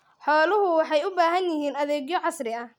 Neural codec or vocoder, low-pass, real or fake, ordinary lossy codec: none; 19.8 kHz; real; none